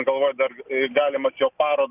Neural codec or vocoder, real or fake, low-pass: none; real; 3.6 kHz